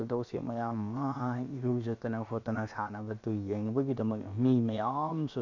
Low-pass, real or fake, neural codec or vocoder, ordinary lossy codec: 7.2 kHz; fake; codec, 16 kHz, about 1 kbps, DyCAST, with the encoder's durations; MP3, 64 kbps